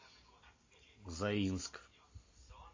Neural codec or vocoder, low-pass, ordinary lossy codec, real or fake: none; 7.2 kHz; MP3, 32 kbps; real